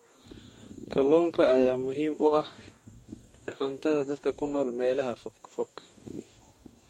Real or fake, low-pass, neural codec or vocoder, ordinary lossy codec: fake; 19.8 kHz; codec, 44.1 kHz, 2.6 kbps, DAC; MP3, 64 kbps